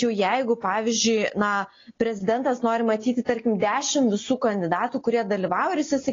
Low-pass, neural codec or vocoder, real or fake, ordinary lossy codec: 7.2 kHz; none; real; AAC, 32 kbps